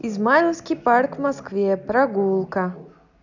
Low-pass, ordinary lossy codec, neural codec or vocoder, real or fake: 7.2 kHz; none; none; real